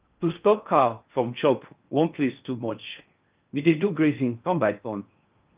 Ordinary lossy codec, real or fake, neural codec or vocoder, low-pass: Opus, 32 kbps; fake; codec, 16 kHz in and 24 kHz out, 0.6 kbps, FocalCodec, streaming, 4096 codes; 3.6 kHz